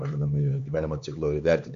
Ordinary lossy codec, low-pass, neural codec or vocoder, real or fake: MP3, 48 kbps; 7.2 kHz; codec, 16 kHz, 4 kbps, X-Codec, HuBERT features, trained on LibriSpeech; fake